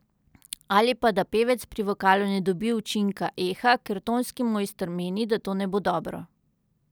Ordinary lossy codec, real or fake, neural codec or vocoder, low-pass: none; real; none; none